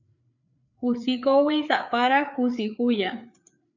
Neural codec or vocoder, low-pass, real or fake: codec, 16 kHz, 4 kbps, FreqCodec, larger model; 7.2 kHz; fake